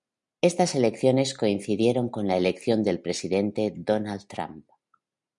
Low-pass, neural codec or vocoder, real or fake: 10.8 kHz; none; real